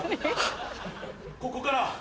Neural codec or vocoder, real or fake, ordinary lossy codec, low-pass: none; real; none; none